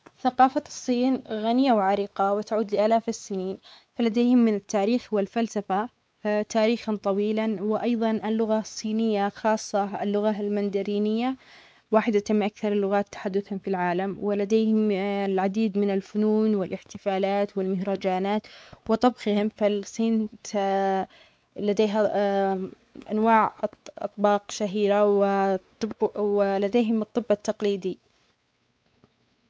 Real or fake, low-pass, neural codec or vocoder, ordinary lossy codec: fake; none; codec, 16 kHz, 4 kbps, X-Codec, WavLM features, trained on Multilingual LibriSpeech; none